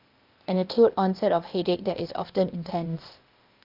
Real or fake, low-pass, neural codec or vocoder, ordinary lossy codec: fake; 5.4 kHz; codec, 16 kHz, 0.8 kbps, ZipCodec; Opus, 24 kbps